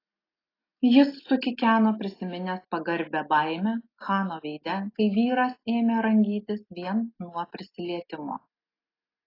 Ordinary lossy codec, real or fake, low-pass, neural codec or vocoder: AAC, 24 kbps; real; 5.4 kHz; none